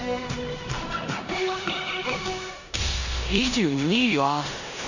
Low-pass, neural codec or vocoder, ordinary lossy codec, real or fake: 7.2 kHz; codec, 16 kHz in and 24 kHz out, 0.9 kbps, LongCat-Audio-Codec, four codebook decoder; none; fake